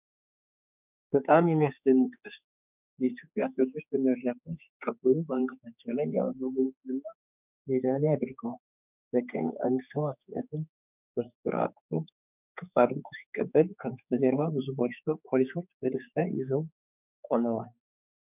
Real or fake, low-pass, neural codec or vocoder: fake; 3.6 kHz; codec, 16 kHz, 4 kbps, X-Codec, HuBERT features, trained on general audio